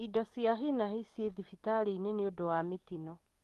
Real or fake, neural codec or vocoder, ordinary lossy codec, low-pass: fake; codec, 44.1 kHz, 7.8 kbps, DAC; Opus, 32 kbps; 14.4 kHz